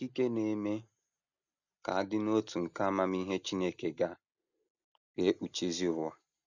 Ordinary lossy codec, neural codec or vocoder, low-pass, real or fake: none; none; 7.2 kHz; real